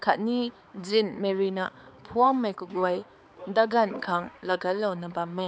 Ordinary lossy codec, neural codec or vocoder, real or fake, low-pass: none; codec, 16 kHz, 4 kbps, X-Codec, HuBERT features, trained on balanced general audio; fake; none